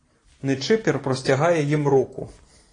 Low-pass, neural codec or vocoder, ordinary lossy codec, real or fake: 9.9 kHz; none; AAC, 32 kbps; real